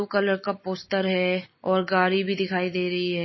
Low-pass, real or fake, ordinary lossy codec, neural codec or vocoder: 7.2 kHz; real; MP3, 24 kbps; none